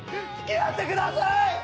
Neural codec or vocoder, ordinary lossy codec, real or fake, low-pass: none; none; real; none